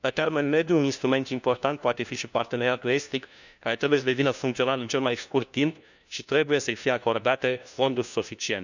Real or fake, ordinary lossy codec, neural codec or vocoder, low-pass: fake; none; codec, 16 kHz, 1 kbps, FunCodec, trained on LibriTTS, 50 frames a second; 7.2 kHz